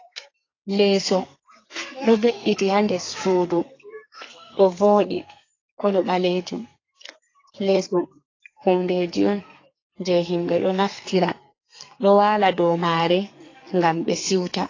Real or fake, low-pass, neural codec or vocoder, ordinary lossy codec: fake; 7.2 kHz; codec, 32 kHz, 1.9 kbps, SNAC; AAC, 32 kbps